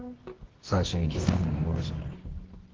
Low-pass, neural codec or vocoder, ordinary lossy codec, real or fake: 7.2 kHz; codec, 24 kHz, 0.9 kbps, WavTokenizer, medium music audio release; Opus, 16 kbps; fake